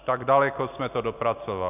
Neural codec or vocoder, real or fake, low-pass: none; real; 3.6 kHz